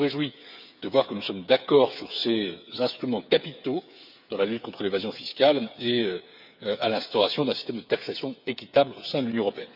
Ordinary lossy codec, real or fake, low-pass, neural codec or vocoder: none; fake; 5.4 kHz; codec, 16 kHz, 8 kbps, FreqCodec, smaller model